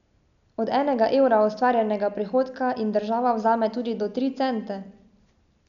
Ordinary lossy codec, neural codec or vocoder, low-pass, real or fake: none; none; 7.2 kHz; real